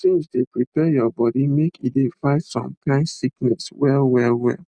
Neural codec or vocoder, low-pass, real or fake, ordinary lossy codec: none; 9.9 kHz; real; none